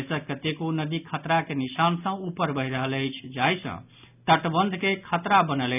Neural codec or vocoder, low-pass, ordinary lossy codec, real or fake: none; 3.6 kHz; none; real